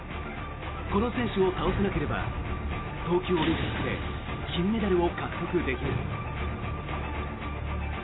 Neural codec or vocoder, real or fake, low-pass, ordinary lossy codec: none; real; 7.2 kHz; AAC, 16 kbps